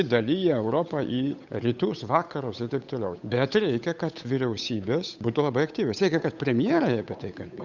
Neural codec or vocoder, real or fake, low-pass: codec, 16 kHz, 8 kbps, FunCodec, trained on Chinese and English, 25 frames a second; fake; 7.2 kHz